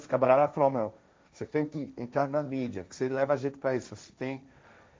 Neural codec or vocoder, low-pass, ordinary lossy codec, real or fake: codec, 16 kHz, 1.1 kbps, Voila-Tokenizer; none; none; fake